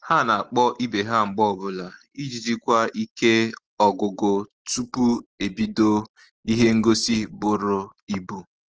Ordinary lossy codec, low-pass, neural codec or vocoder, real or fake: Opus, 16 kbps; 7.2 kHz; none; real